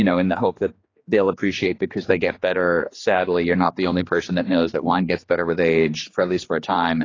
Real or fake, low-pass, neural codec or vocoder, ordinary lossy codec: fake; 7.2 kHz; codec, 16 kHz, 2 kbps, X-Codec, HuBERT features, trained on general audio; AAC, 32 kbps